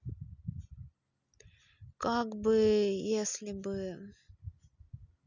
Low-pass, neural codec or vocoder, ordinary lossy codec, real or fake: 7.2 kHz; none; none; real